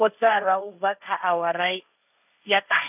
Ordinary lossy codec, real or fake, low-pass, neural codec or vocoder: none; fake; 3.6 kHz; codec, 16 kHz, 1.1 kbps, Voila-Tokenizer